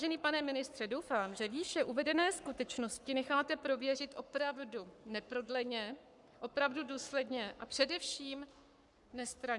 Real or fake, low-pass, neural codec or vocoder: fake; 10.8 kHz; codec, 44.1 kHz, 7.8 kbps, Pupu-Codec